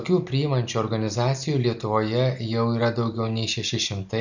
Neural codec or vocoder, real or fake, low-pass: none; real; 7.2 kHz